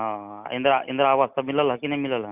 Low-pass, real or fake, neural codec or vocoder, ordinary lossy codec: 3.6 kHz; real; none; Opus, 64 kbps